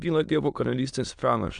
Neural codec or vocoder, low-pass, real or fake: autoencoder, 22.05 kHz, a latent of 192 numbers a frame, VITS, trained on many speakers; 9.9 kHz; fake